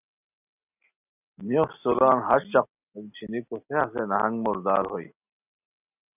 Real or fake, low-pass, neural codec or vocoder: real; 3.6 kHz; none